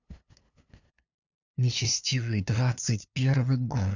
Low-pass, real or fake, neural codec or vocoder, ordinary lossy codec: 7.2 kHz; fake; codec, 16 kHz, 2 kbps, FunCodec, trained on LibriTTS, 25 frames a second; none